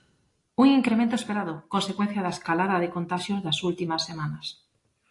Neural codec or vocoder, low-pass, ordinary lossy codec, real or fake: none; 10.8 kHz; AAC, 48 kbps; real